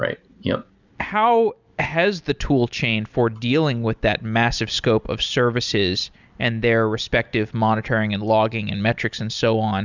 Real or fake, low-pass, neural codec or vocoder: real; 7.2 kHz; none